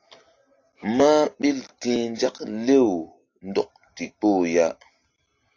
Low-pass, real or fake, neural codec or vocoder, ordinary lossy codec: 7.2 kHz; real; none; AAC, 48 kbps